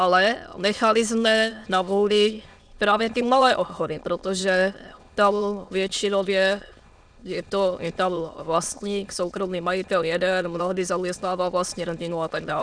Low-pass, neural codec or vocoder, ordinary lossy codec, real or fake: 9.9 kHz; autoencoder, 22.05 kHz, a latent of 192 numbers a frame, VITS, trained on many speakers; Opus, 64 kbps; fake